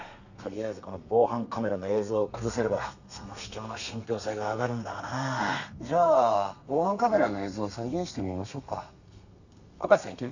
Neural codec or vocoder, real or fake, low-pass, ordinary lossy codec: codec, 32 kHz, 1.9 kbps, SNAC; fake; 7.2 kHz; none